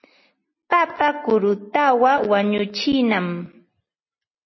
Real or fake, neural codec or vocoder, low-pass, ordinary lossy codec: real; none; 7.2 kHz; MP3, 24 kbps